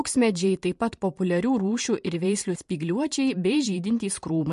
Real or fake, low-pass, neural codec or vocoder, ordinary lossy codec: fake; 14.4 kHz; vocoder, 44.1 kHz, 128 mel bands every 512 samples, BigVGAN v2; MP3, 48 kbps